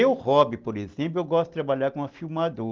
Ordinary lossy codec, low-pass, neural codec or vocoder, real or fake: Opus, 16 kbps; 7.2 kHz; none; real